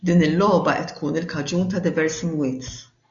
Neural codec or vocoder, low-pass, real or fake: none; 7.2 kHz; real